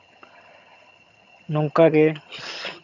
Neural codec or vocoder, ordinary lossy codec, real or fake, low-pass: vocoder, 22.05 kHz, 80 mel bands, HiFi-GAN; none; fake; 7.2 kHz